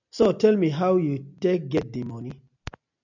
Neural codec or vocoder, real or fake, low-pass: none; real; 7.2 kHz